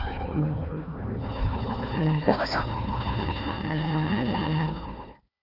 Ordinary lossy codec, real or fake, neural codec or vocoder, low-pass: none; fake; codec, 16 kHz, 1 kbps, FunCodec, trained on Chinese and English, 50 frames a second; 5.4 kHz